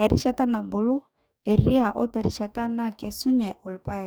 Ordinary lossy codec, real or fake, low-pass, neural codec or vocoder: none; fake; none; codec, 44.1 kHz, 2.6 kbps, DAC